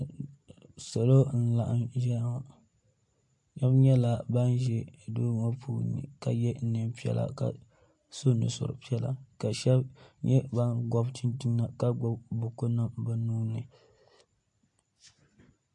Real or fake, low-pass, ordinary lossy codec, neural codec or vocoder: real; 10.8 kHz; MP3, 48 kbps; none